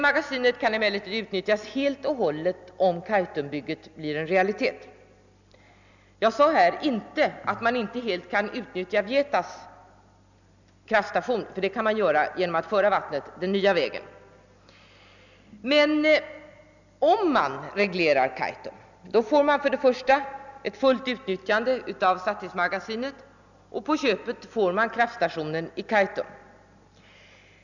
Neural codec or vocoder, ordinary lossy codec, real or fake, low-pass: none; none; real; 7.2 kHz